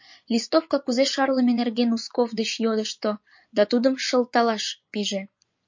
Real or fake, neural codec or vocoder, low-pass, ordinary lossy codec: fake; vocoder, 24 kHz, 100 mel bands, Vocos; 7.2 kHz; MP3, 48 kbps